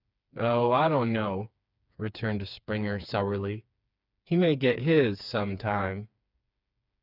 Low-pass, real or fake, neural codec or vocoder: 5.4 kHz; fake; codec, 16 kHz, 4 kbps, FreqCodec, smaller model